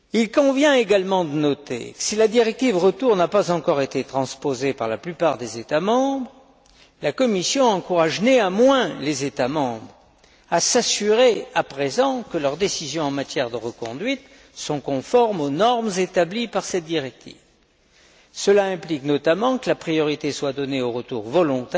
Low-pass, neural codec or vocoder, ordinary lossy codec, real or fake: none; none; none; real